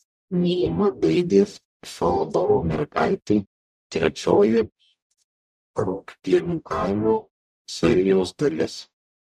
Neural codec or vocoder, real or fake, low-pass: codec, 44.1 kHz, 0.9 kbps, DAC; fake; 14.4 kHz